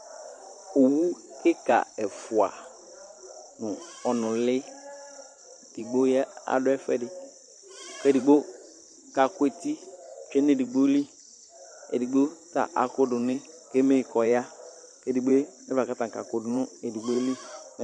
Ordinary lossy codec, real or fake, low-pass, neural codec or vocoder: MP3, 48 kbps; fake; 9.9 kHz; vocoder, 44.1 kHz, 128 mel bands every 512 samples, BigVGAN v2